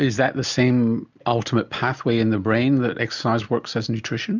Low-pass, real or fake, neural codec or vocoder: 7.2 kHz; real; none